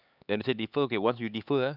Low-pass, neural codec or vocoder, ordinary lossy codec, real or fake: 5.4 kHz; codec, 16 kHz, 4 kbps, X-Codec, HuBERT features, trained on LibriSpeech; none; fake